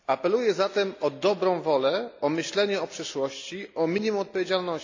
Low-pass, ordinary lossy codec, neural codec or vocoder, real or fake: 7.2 kHz; AAC, 48 kbps; none; real